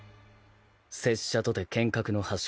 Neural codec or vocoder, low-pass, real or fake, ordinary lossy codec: none; none; real; none